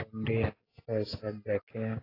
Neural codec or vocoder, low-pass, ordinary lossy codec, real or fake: none; 5.4 kHz; AAC, 24 kbps; real